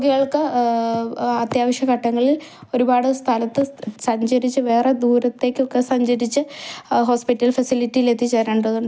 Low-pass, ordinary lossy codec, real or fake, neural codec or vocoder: none; none; real; none